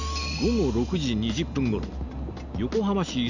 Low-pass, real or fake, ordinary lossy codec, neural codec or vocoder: 7.2 kHz; real; none; none